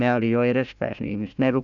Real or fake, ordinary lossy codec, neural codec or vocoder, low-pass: fake; none; codec, 16 kHz, 1 kbps, FunCodec, trained on Chinese and English, 50 frames a second; 7.2 kHz